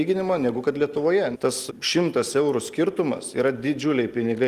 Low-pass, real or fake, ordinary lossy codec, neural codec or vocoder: 14.4 kHz; real; Opus, 24 kbps; none